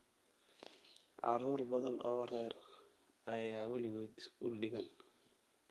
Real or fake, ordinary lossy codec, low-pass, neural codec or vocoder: fake; Opus, 32 kbps; 14.4 kHz; codec, 32 kHz, 1.9 kbps, SNAC